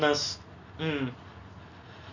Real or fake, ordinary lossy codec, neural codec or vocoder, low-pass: real; none; none; 7.2 kHz